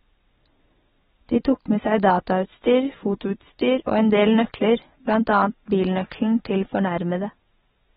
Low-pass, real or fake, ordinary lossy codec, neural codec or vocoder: 19.8 kHz; real; AAC, 16 kbps; none